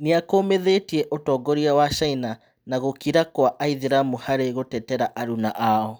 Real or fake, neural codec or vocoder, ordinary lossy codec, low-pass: real; none; none; none